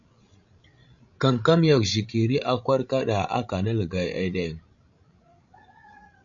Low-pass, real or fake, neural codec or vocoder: 7.2 kHz; fake; codec, 16 kHz, 16 kbps, FreqCodec, larger model